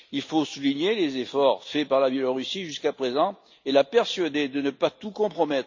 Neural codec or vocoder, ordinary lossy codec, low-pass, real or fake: none; MP3, 64 kbps; 7.2 kHz; real